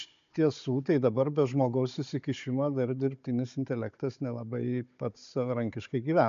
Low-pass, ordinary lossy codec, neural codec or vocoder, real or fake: 7.2 kHz; MP3, 64 kbps; codec, 16 kHz, 16 kbps, FunCodec, trained on Chinese and English, 50 frames a second; fake